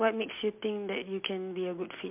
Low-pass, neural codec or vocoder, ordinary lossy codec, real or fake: 3.6 kHz; none; MP3, 32 kbps; real